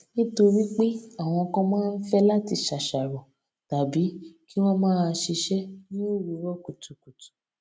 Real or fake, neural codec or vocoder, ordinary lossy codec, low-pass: real; none; none; none